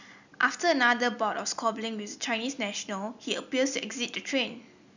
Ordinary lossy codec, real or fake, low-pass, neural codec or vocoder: none; real; 7.2 kHz; none